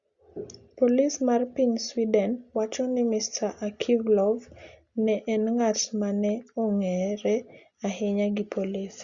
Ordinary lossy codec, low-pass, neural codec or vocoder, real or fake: Opus, 64 kbps; 7.2 kHz; none; real